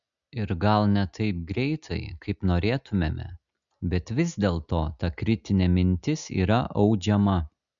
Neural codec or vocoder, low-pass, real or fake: none; 7.2 kHz; real